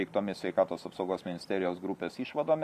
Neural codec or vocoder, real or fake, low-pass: vocoder, 44.1 kHz, 128 mel bands every 512 samples, BigVGAN v2; fake; 14.4 kHz